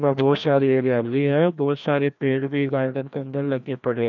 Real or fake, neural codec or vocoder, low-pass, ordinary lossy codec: fake; codec, 16 kHz, 1 kbps, FreqCodec, larger model; 7.2 kHz; none